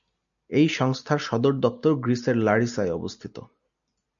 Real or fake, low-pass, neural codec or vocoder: real; 7.2 kHz; none